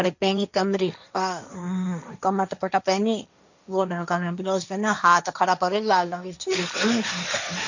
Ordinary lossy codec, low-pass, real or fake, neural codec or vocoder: none; 7.2 kHz; fake; codec, 16 kHz, 1.1 kbps, Voila-Tokenizer